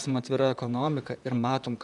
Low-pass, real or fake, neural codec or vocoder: 10.8 kHz; fake; vocoder, 44.1 kHz, 128 mel bands, Pupu-Vocoder